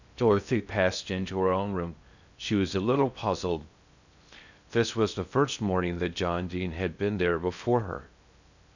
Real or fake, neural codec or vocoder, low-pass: fake; codec, 16 kHz in and 24 kHz out, 0.6 kbps, FocalCodec, streaming, 2048 codes; 7.2 kHz